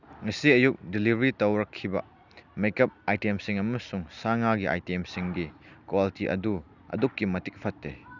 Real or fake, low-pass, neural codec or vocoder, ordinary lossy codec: real; 7.2 kHz; none; none